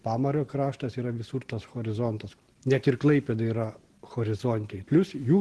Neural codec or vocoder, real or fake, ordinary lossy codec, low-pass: none; real; Opus, 16 kbps; 10.8 kHz